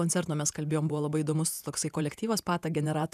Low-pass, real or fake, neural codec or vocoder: 14.4 kHz; fake; vocoder, 48 kHz, 128 mel bands, Vocos